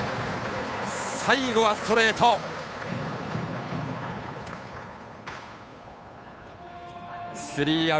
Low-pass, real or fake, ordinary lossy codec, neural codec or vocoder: none; fake; none; codec, 16 kHz, 2 kbps, FunCodec, trained on Chinese and English, 25 frames a second